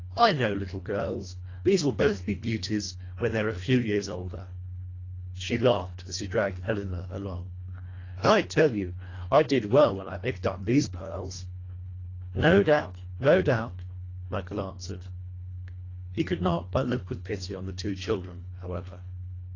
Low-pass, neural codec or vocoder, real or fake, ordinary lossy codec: 7.2 kHz; codec, 24 kHz, 1.5 kbps, HILCodec; fake; AAC, 32 kbps